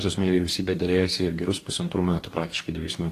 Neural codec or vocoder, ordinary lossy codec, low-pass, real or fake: codec, 44.1 kHz, 2.6 kbps, DAC; AAC, 48 kbps; 14.4 kHz; fake